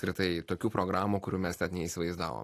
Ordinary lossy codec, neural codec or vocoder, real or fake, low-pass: AAC, 48 kbps; none; real; 14.4 kHz